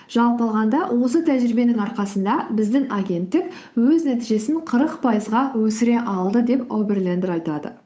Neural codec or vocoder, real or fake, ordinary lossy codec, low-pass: codec, 16 kHz, 8 kbps, FunCodec, trained on Chinese and English, 25 frames a second; fake; none; none